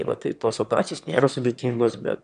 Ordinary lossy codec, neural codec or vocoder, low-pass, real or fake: MP3, 96 kbps; autoencoder, 22.05 kHz, a latent of 192 numbers a frame, VITS, trained on one speaker; 9.9 kHz; fake